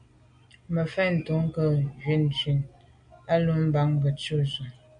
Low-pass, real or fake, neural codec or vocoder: 9.9 kHz; real; none